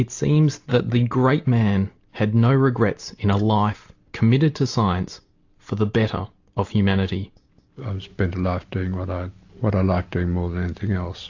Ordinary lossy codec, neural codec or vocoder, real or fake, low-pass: AAC, 48 kbps; none; real; 7.2 kHz